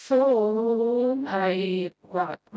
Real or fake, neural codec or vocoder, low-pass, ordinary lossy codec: fake; codec, 16 kHz, 0.5 kbps, FreqCodec, smaller model; none; none